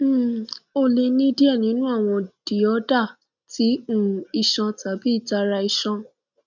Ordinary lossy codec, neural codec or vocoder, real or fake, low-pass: none; none; real; 7.2 kHz